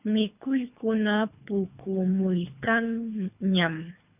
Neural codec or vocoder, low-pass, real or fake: codec, 24 kHz, 3 kbps, HILCodec; 3.6 kHz; fake